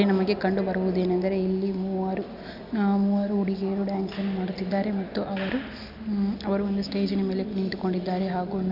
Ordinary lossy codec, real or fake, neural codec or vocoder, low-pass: none; real; none; 5.4 kHz